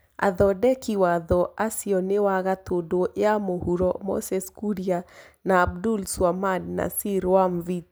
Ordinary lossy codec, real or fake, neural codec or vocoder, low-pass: none; real; none; none